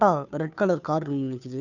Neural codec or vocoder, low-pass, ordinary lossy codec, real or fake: codec, 16 kHz, 2 kbps, FunCodec, trained on Chinese and English, 25 frames a second; 7.2 kHz; none; fake